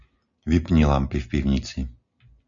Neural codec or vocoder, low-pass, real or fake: none; 7.2 kHz; real